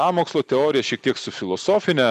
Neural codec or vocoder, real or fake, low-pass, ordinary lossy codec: none; real; 14.4 kHz; MP3, 96 kbps